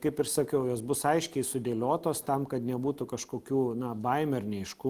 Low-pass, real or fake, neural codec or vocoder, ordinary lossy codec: 14.4 kHz; real; none; Opus, 24 kbps